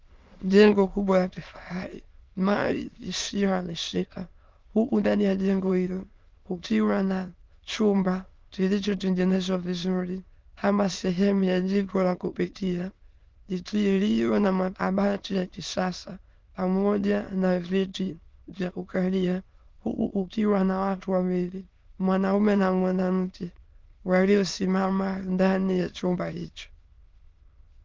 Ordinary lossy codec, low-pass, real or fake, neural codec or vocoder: Opus, 32 kbps; 7.2 kHz; fake; autoencoder, 22.05 kHz, a latent of 192 numbers a frame, VITS, trained on many speakers